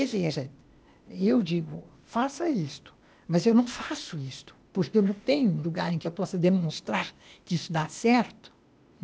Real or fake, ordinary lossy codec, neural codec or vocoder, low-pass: fake; none; codec, 16 kHz, 0.8 kbps, ZipCodec; none